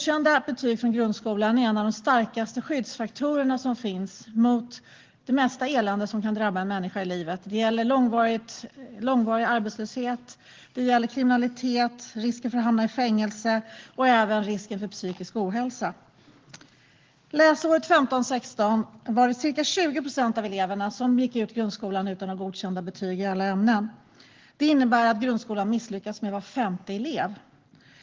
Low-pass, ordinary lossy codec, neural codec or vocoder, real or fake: 7.2 kHz; Opus, 16 kbps; none; real